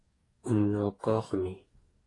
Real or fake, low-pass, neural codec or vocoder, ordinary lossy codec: fake; 10.8 kHz; codec, 44.1 kHz, 2.6 kbps, DAC; AAC, 32 kbps